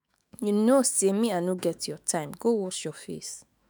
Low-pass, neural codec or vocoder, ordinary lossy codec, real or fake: none; autoencoder, 48 kHz, 128 numbers a frame, DAC-VAE, trained on Japanese speech; none; fake